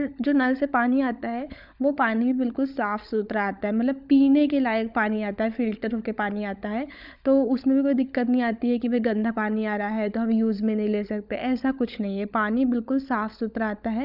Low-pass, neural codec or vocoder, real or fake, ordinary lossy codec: 5.4 kHz; codec, 16 kHz, 16 kbps, FunCodec, trained on LibriTTS, 50 frames a second; fake; none